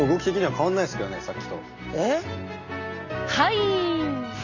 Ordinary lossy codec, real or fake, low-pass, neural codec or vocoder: none; real; 7.2 kHz; none